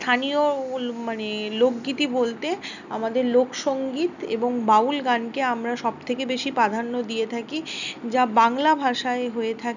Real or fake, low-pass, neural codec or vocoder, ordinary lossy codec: real; 7.2 kHz; none; none